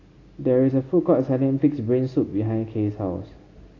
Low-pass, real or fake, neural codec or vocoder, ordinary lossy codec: 7.2 kHz; real; none; AAC, 32 kbps